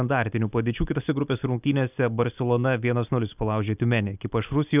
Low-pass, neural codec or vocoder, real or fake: 3.6 kHz; none; real